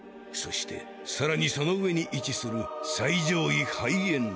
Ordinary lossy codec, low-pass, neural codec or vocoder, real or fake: none; none; none; real